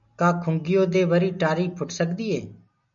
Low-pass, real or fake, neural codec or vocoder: 7.2 kHz; real; none